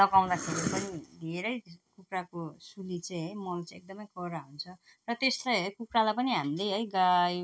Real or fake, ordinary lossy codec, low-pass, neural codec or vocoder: real; none; none; none